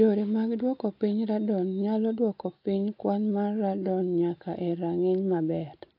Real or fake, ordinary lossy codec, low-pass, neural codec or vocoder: real; AAC, 32 kbps; 5.4 kHz; none